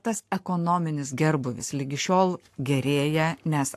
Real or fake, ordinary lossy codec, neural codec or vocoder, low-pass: fake; AAC, 64 kbps; codec, 44.1 kHz, 7.8 kbps, Pupu-Codec; 14.4 kHz